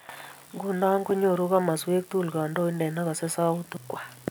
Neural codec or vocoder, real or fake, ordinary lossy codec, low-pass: none; real; none; none